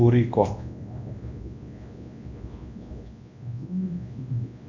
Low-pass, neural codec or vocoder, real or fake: 7.2 kHz; codec, 24 kHz, 0.9 kbps, WavTokenizer, large speech release; fake